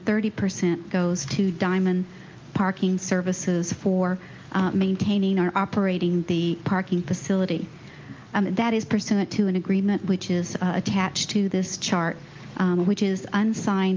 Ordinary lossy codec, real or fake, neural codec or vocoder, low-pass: Opus, 24 kbps; real; none; 7.2 kHz